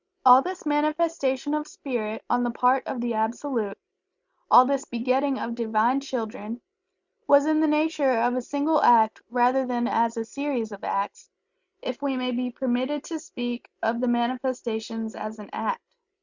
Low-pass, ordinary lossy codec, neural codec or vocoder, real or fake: 7.2 kHz; Opus, 64 kbps; none; real